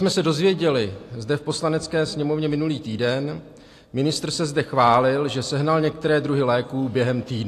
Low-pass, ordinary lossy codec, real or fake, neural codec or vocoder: 14.4 kHz; AAC, 64 kbps; real; none